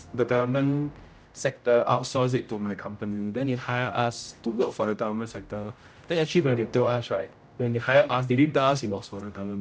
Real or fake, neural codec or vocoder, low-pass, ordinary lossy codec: fake; codec, 16 kHz, 0.5 kbps, X-Codec, HuBERT features, trained on general audio; none; none